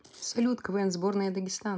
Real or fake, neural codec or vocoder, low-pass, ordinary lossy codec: real; none; none; none